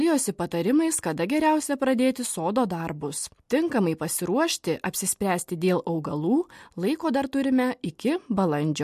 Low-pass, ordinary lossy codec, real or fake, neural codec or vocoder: 14.4 kHz; MP3, 64 kbps; real; none